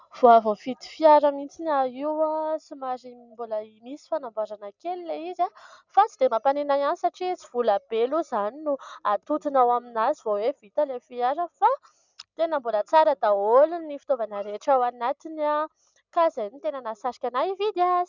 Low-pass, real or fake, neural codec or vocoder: 7.2 kHz; real; none